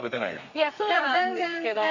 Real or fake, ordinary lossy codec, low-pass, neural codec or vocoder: fake; none; 7.2 kHz; codec, 32 kHz, 1.9 kbps, SNAC